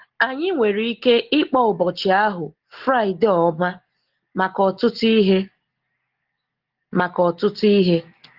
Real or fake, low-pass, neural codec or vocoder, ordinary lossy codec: real; 5.4 kHz; none; Opus, 16 kbps